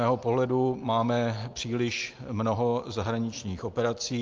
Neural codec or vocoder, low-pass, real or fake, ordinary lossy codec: none; 7.2 kHz; real; Opus, 32 kbps